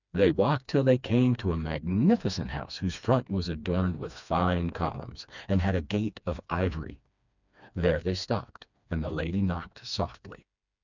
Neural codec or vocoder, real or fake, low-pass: codec, 16 kHz, 2 kbps, FreqCodec, smaller model; fake; 7.2 kHz